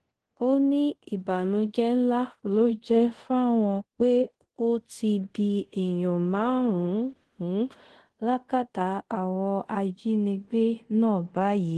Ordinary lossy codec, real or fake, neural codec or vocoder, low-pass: Opus, 16 kbps; fake; codec, 24 kHz, 0.5 kbps, DualCodec; 10.8 kHz